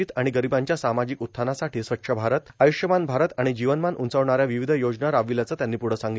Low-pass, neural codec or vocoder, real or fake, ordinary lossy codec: none; none; real; none